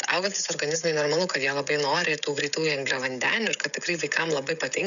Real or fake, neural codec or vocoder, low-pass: fake; codec, 16 kHz, 16 kbps, FreqCodec, smaller model; 7.2 kHz